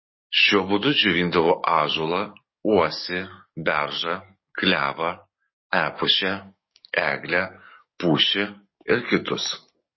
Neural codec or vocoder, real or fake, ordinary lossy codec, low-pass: codec, 44.1 kHz, 7.8 kbps, DAC; fake; MP3, 24 kbps; 7.2 kHz